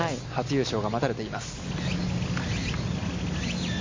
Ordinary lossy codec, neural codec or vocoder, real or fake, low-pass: MP3, 32 kbps; none; real; 7.2 kHz